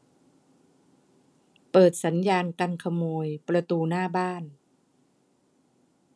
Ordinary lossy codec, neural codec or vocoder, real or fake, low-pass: none; none; real; none